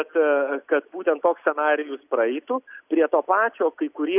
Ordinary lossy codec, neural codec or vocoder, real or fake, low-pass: AAC, 32 kbps; none; real; 3.6 kHz